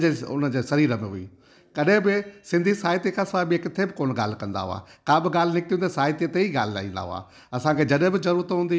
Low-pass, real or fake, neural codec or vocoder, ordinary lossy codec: none; real; none; none